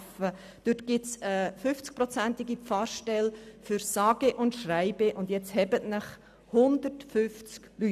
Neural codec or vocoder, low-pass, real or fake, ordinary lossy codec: none; 14.4 kHz; real; none